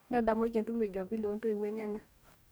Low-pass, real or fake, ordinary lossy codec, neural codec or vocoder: none; fake; none; codec, 44.1 kHz, 2.6 kbps, DAC